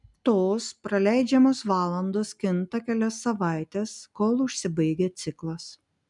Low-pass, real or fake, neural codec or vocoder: 10.8 kHz; real; none